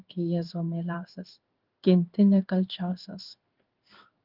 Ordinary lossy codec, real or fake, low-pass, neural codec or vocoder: Opus, 32 kbps; fake; 5.4 kHz; codec, 16 kHz in and 24 kHz out, 1 kbps, XY-Tokenizer